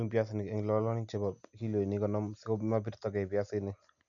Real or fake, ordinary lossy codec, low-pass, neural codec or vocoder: real; none; 7.2 kHz; none